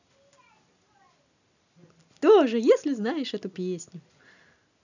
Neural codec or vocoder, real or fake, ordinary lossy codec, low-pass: none; real; none; 7.2 kHz